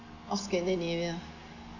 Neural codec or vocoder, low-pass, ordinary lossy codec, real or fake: none; 7.2 kHz; none; real